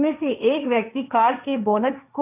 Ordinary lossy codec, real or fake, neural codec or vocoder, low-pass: none; fake; codec, 16 kHz, 1.1 kbps, Voila-Tokenizer; 3.6 kHz